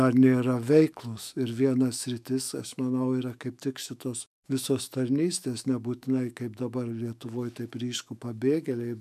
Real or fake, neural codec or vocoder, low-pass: fake; autoencoder, 48 kHz, 128 numbers a frame, DAC-VAE, trained on Japanese speech; 14.4 kHz